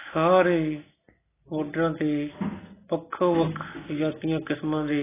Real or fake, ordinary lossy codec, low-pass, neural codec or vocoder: real; AAC, 16 kbps; 3.6 kHz; none